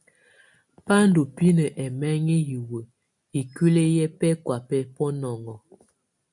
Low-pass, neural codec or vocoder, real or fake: 10.8 kHz; none; real